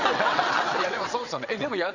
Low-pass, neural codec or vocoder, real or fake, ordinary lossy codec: 7.2 kHz; vocoder, 22.05 kHz, 80 mel bands, WaveNeXt; fake; none